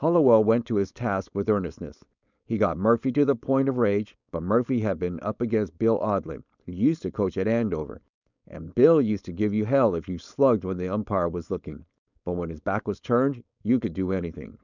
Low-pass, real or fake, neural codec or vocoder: 7.2 kHz; fake; codec, 16 kHz, 4.8 kbps, FACodec